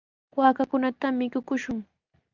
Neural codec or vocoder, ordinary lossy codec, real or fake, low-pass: none; Opus, 24 kbps; real; 7.2 kHz